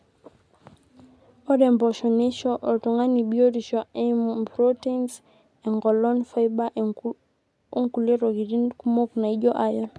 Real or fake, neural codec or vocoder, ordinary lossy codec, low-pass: real; none; none; none